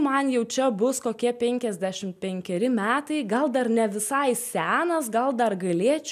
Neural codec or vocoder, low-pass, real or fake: none; 14.4 kHz; real